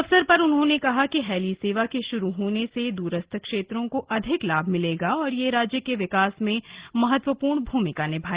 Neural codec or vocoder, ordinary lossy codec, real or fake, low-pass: none; Opus, 16 kbps; real; 3.6 kHz